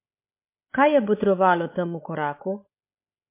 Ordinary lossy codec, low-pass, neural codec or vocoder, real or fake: MP3, 24 kbps; 3.6 kHz; codec, 16 kHz, 16 kbps, FreqCodec, larger model; fake